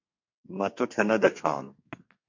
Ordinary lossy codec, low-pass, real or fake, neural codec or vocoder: MP3, 48 kbps; 7.2 kHz; fake; codec, 32 kHz, 1.9 kbps, SNAC